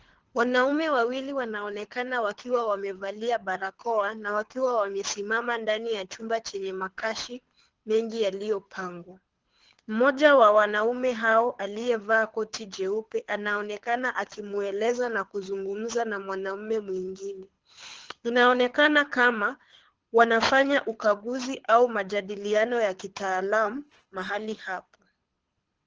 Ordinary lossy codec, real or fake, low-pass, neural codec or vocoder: Opus, 16 kbps; fake; 7.2 kHz; codec, 24 kHz, 6 kbps, HILCodec